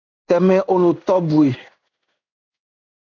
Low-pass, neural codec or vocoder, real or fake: 7.2 kHz; codec, 16 kHz in and 24 kHz out, 1 kbps, XY-Tokenizer; fake